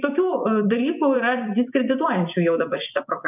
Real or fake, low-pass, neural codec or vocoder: fake; 3.6 kHz; vocoder, 24 kHz, 100 mel bands, Vocos